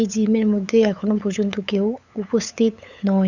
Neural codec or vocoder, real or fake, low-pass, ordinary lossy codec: codec, 16 kHz, 8 kbps, FunCodec, trained on LibriTTS, 25 frames a second; fake; 7.2 kHz; none